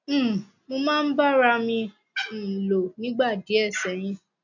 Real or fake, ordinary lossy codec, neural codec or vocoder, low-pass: real; none; none; 7.2 kHz